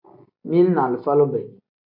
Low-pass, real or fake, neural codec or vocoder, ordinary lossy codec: 5.4 kHz; real; none; AAC, 48 kbps